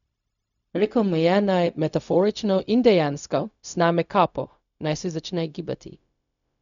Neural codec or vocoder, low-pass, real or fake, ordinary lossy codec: codec, 16 kHz, 0.4 kbps, LongCat-Audio-Codec; 7.2 kHz; fake; none